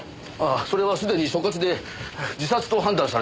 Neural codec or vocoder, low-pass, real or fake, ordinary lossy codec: none; none; real; none